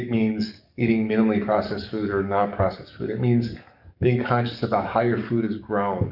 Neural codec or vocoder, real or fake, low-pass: codec, 44.1 kHz, 7.8 kbps, DAC; fake; 5.4 kHz